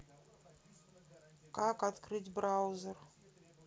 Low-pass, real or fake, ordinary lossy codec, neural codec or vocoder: none; real; none; none